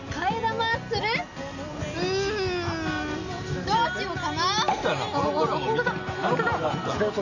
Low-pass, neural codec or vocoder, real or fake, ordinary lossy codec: 7.2 kHz; none; real; none